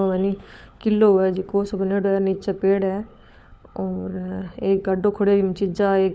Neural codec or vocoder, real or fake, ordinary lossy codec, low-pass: codec, 16 kHz, 16 kbps, FunCodec, trained on LibriTTS, 50 frames a second; fake; none; none